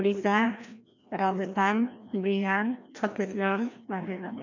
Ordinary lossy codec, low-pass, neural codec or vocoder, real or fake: none; 7.2 kHz; codec, 16 kHz, 1 kbps, FreqCodec, larger model; fake